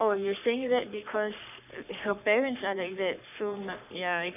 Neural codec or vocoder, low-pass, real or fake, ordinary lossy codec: codec, 44.1 kHz, 3.4 kbps, Pupu-Codec; 3.6 kHz; fake; none